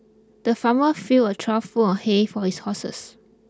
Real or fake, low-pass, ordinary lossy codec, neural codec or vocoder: real; none; none; none